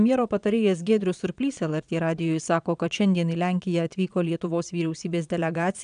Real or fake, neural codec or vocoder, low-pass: fake; vocoder, 22.05 kHz, 80 mel bands, Vocos; 9.9 kHz